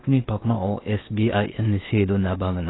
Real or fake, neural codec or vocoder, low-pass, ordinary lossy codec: fake; codec, 16 kHz in and 24 kHz out, 0.8 kbps, FocalCodec, streaming, 65536 codes; 7.2 kHz; AAC, 16 kbps